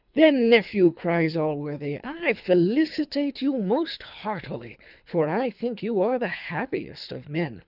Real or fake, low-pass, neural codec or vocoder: fake; 5.4 kHz; codec, 24 kHz, 3 kbps, HILCodec